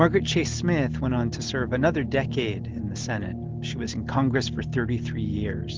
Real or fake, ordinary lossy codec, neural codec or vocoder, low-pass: real; Opus, 16 kbps; none; 7.2 kHz